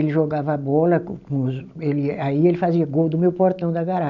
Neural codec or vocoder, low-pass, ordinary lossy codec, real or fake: none; 7.2 kHz; none; real